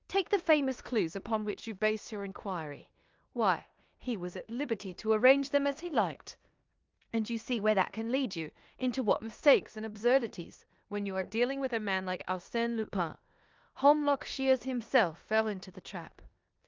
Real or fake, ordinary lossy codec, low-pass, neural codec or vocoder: fake; Opus, 24 kbps; 7.2 kHz; codec, 16 kHz in and 24 kHz out, 0.9 kbps, LongCat-Audio-Codec, four codebook decoder